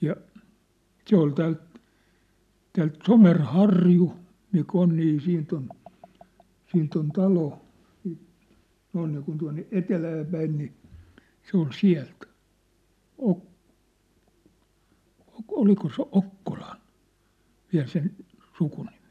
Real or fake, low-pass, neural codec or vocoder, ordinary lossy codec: real; 14.4 kHz; none; none